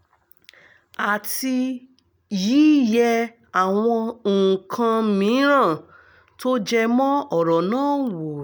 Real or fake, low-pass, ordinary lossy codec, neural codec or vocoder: real; none; none; none